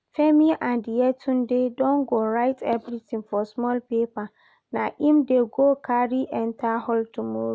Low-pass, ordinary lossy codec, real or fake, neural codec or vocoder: none; none; real; none